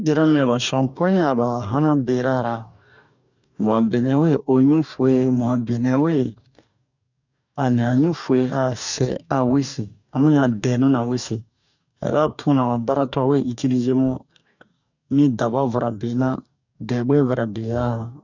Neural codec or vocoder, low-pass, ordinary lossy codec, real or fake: codec, 44.1 kHz, 2.6 kbps, DAC; 7.2 kHz; none; fake